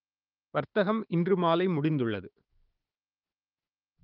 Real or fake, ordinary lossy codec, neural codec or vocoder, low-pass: fake; Opus, 24 kbps; codec, 16 kHz, 6 kbps, DAC; 5.4 kHz